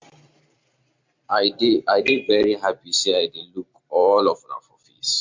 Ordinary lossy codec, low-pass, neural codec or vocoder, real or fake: MP3, 48 kbps; 7.2 kHz; vocoder, 44.1 kHz, 128 mel bands every 256 samples, BigVGAN v2; fake